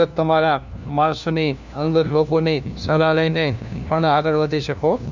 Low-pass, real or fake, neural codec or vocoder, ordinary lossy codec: 7.2 kHz; fake; codec, 16 kHz, 1 kbps, FunCodec, trained on LibriTTS, 50 frames a second; none